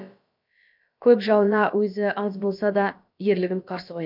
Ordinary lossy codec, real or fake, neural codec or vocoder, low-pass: MP3, 48 kbps; fake; codec, 16 kHz, about 1 kbps, DyCAST, with the encoder's durations; 5.4 kHz